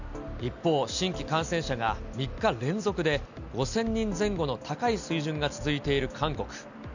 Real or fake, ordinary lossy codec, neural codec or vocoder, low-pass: real; none; none; 7.2 kHz